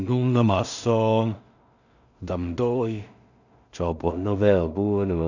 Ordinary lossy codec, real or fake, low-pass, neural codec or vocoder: none; fake; 7.2 kHz; codec, 16 kHz in and 24 kHz out, 0.4 kbps, LongCat-Audio-Codec, two codebook decoder